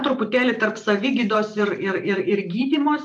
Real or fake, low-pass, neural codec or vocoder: fake; 10.8 kHz; vocoder, 44.1 kHz, 128 mel bands every 512 samples, BigVGAN v2